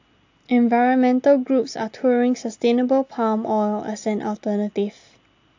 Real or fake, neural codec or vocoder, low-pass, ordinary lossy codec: real; none; 7.2 kHz; AAC, 48 kbps